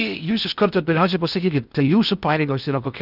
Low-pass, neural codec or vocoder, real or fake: 5.4 kHz; codec, 16 kHz in and 24 kHz out, 0.6 kbps, FocalCodec, streaming, 4096 codes; fake